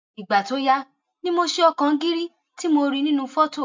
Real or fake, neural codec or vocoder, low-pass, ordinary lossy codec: real; none; 7.2 kHz; MP3, 64 kbps